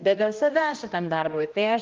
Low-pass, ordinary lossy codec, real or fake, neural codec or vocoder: 7.2 kHz; Opus, 32 kbps; fake; codec, 16 kHz, 1 kbps, X-Codec, HuBERT features, trained on general audio